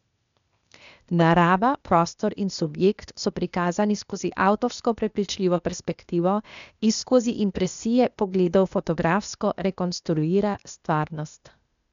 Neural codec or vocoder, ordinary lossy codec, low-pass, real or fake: codec, 16 kHz, 0.8 kbps, ZipCodec; none; 7.2 kHz; fake